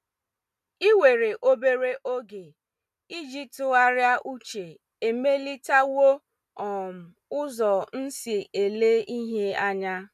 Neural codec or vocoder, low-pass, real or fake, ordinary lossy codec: none; 14.4 kHz; real; none